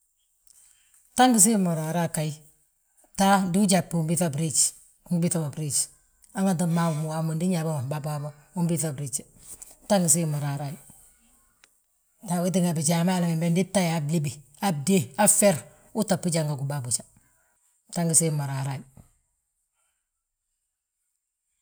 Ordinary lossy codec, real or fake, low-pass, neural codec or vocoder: none; real; none; none